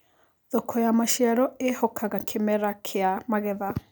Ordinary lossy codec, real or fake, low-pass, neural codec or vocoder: none; real; none; none